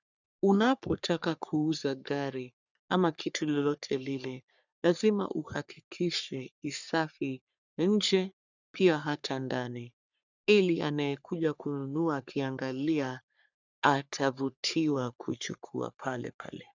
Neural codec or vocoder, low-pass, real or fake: codec, 44.1 kHz, 3.4 kbps, Pupu-Codec; 7.2 kHz; fake